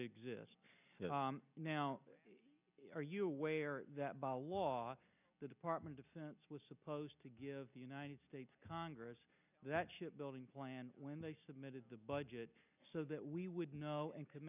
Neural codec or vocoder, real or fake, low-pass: none; real; 3.6 kHz